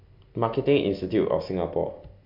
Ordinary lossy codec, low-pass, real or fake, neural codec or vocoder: MP3, 48 kbps; 5.4 kHz; real; none